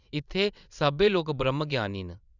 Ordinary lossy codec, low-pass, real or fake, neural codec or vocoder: none; 7.2 kHz; fake; vocoder, 22.05 kHz, 80 mel bands, WaveNeXt